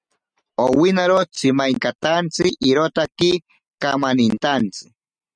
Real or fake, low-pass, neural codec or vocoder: real; 9.9 kHz; none